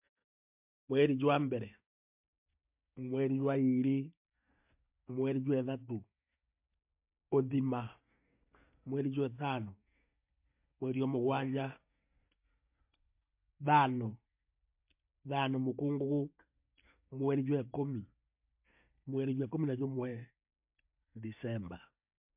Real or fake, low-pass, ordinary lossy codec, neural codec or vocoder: real; 3.6 kHz; MP3, 32 kbps; none